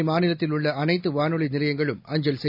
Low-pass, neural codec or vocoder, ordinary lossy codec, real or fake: 5.4 kHz; none; none; real